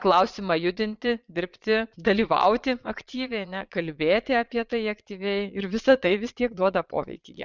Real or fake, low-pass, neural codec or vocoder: fake; 7.2 kHz; vocoder, 22.05 kHz, 80 mel bands, Vocos